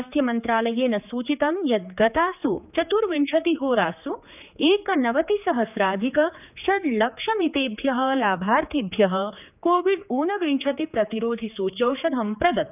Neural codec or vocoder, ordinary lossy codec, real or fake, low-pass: codec, 16 kHz, 4 kbps, X-Codec, HuBERT features, trained on general audio; none; fake; 3.6 kHz